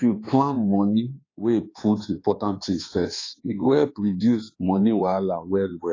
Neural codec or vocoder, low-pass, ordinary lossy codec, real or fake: codec, 24 kHz, 1.2 kbps, DualCodec; 7.2 kHz; AAC, 32 kbps; fake